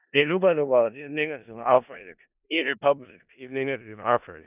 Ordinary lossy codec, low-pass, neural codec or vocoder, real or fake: none; 3.6 kHz; codec, 16 kHz in and 24 kHz out, 0.4 kbps, LongCat-Audio-Codec, four codebook decoder; fake